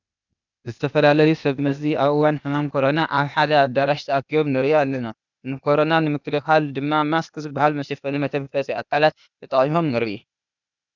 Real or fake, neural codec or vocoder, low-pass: fake; codec, 16 kHz, 0.8 kbps, ZipCodec; 7.2 kHz